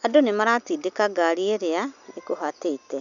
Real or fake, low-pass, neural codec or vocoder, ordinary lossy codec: real; 7.2 kHz; none; none